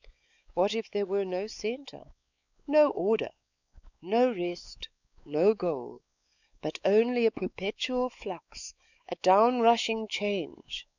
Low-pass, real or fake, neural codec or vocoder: 7.2 kHz; fake; codec, 16 kHz, 4 kbps, X-Codec, WavLM features, trained on Multilingual LibriSpeech